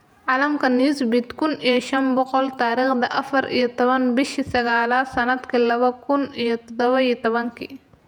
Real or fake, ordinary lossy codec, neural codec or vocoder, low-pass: fake; none; vocoder, 48 kHz, 128 mel bands, Vocos; 19.8 kHz